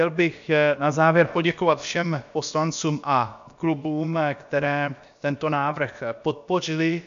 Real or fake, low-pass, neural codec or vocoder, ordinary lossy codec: fake; 7.2 kHz; codec, 16 kHz, about 1 kbps, DyCAST, with the encoder's durations; MP3, 64 kbps